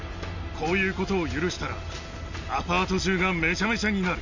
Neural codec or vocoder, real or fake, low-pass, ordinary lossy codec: none; real; 7.2 kHz; none